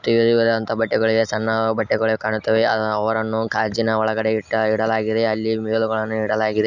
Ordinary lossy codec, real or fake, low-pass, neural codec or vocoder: none; real; 7.2 kHz; none